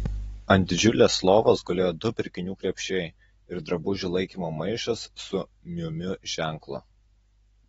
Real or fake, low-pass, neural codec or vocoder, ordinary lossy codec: real; 10.8 kHz; none; AAC, 24 kbps